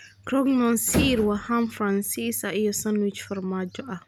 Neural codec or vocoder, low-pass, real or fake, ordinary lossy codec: none; none; real; none